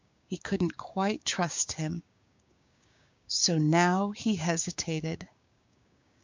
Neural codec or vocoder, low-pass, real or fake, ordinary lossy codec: codec, 16 kHz, 6 kbps, DAC; 7.2 kHz; fake; MP3, 64 kbps